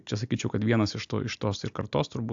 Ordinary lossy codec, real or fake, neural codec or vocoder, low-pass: AAC, 64 kbps; real; none; 7.2 kHz